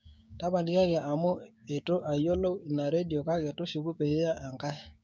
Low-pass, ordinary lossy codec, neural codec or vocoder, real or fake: none; none; codec, 16 kHz, 6 kbps, DAC; fake